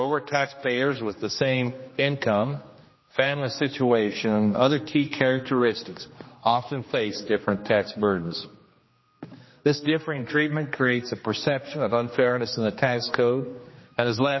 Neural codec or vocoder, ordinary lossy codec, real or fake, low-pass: codec, 16 kHz, 2 kbps, X-Codec, HuBERT features, trained on general audio; MP3, 24 kbps; fake; 7.2 kHz